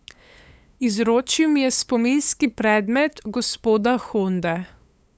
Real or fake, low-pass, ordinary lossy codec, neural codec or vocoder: fake; none; none; codec, 16 kHz, 8 kbps, FunCodec, trained on LibriTTS, 25 frames a second